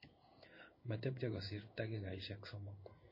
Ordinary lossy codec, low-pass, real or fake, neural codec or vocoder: MP3, 24 kbps; 5.4 kHz; fake; codec, 16 kHz in and 24 kHz out, 1 kbps, XY-Tokenizer